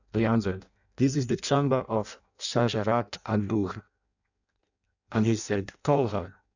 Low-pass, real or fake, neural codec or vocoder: 7.2 kHz; fake; codec, 16 kHz in and 24 kHz out, 0.6 kbps, FireRedTTS-2 codec